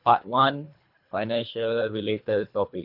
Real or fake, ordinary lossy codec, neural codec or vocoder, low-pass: fake; none; codec, 24 kHz, 3 kbps, HILCodec; 5.4 kHz